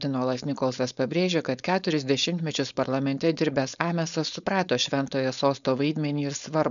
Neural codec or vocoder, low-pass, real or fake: codec, 16 kHz, 4.8 kbps, FACodec; 7.2 kHz; fake